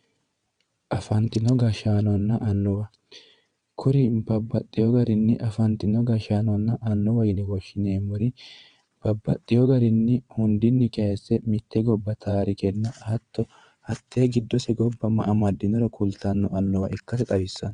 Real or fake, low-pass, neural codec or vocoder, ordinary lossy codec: fake; 9.9 kHz; vocoder, 22.05 kHz, 80 mel bands, WaveNeXt; Opus, 64 kbps